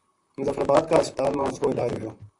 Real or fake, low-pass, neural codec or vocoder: fake; 10.8 kHz; vocoder, 44.1 kHz, 128 mel bands, Pupu-Vocoder